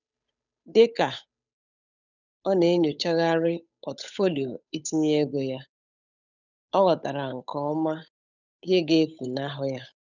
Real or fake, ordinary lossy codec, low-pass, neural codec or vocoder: fake; none; 7.2 kHz; codec, 16 kHz, 8 kbps, FunCodec, trained on Chinese and English, 25 frames a second